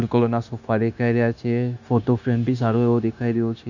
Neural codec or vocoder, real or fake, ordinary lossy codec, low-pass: codec, 16 kHz, 0.9 kbps, LongCat-Audio-Codec; fake; none; 7.2 kHz